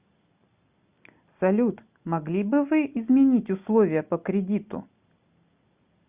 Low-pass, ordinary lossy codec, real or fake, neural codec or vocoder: 3.6 kHz; Opus, 64 kbps; real; none